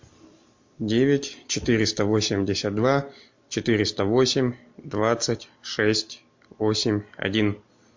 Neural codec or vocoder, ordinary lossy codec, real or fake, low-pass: none; MP3, 48 kbps; real; 7.2 kHz